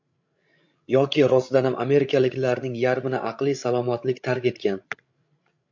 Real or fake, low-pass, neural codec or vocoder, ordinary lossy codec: fake; 7.2 kHz; codec, 16 kHz, 16 kbps, FreqCodec, larger model; MP3, 48 kbps